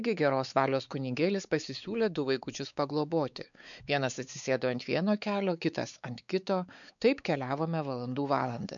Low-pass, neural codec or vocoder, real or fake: 7.2 kHz; codec, 16 kHz, 4 kbps, X-Codec, WavLM features, trained on Multilingual LibriSpeech; fake